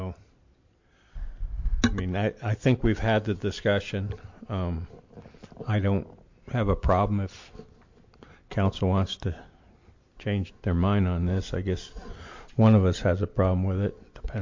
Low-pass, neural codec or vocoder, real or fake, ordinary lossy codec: 7.2 kHz; none; real; MP3, 48 kbps